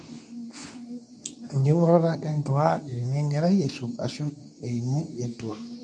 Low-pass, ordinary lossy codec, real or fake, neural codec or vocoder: none; none; fake; codec, 24 kHz, 0.9 kbps, WavTokenizer, medium speech release version 2